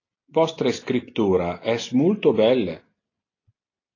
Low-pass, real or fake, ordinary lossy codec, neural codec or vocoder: 7.2 kHz; real; AAC, 32 kbps; none